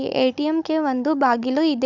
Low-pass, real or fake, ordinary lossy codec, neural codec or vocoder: 7.2 kHz; fake; none; codec, 16 kHz, 16 kbps, FunCodec, trained on Chinese and English, 50 frames a second